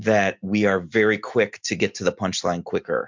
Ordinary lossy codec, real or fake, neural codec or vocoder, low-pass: MP3, 64 kbps; real; none; 7.2 kHz